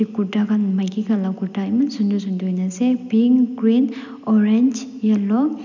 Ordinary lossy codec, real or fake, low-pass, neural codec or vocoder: none; real; 7.2 kHz; none